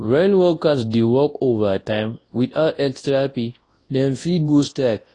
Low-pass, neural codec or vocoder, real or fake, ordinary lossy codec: 10.8 kHz; codec, 24 kHz, 0.9 kbps, WavTokenizer, large speech release; fake; AAC, 32 kbps